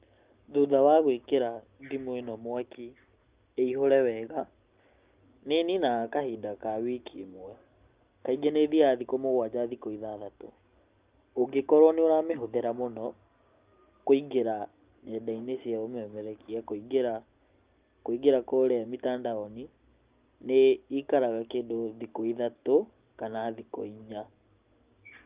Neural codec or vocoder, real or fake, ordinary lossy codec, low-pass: none; real; Opus, 32 kbps; 3.6 kHz